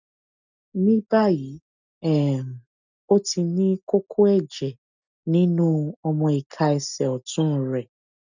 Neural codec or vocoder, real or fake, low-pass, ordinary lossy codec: none; real; 7.2 kHz; none